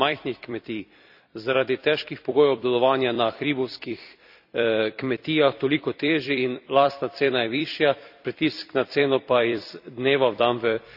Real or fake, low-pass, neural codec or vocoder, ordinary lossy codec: fake; 5.4 kHz; vocoder, 44.1 kHz, 128 mel bands every 256 samples, BigVGAN v2; none